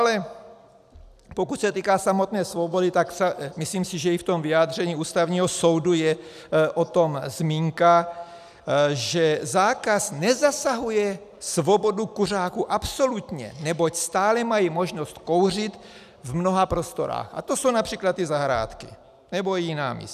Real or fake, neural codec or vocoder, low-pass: real; none; 14.4 kHz